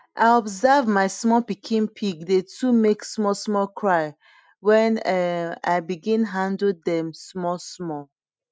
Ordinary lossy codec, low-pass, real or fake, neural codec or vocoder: none; none; real; none